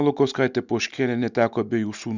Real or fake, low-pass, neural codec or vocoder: real; 7.2 kHz; none